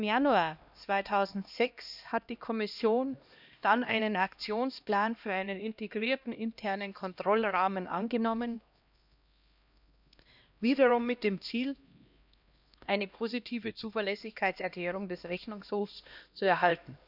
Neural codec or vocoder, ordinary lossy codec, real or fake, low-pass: codec, 16 kHz, 1 kbps, X-Codec, HuBERT features, trained on LibriSpeech; none; fake; 5.4 kHz